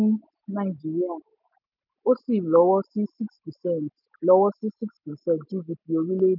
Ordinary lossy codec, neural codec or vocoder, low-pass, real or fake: none; none; 5.4 kHz; real